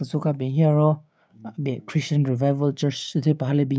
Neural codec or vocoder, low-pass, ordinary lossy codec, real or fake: codec, 16 kHz, 6 kbps, DAC; none; none; fake